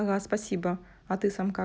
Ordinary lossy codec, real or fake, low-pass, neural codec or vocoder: none; real; none; none